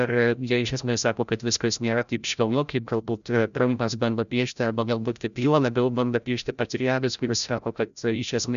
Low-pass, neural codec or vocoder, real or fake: 7.2 kHz; codec, 16 kHz, 0.5 kbps, FreqCodec, larger model; fake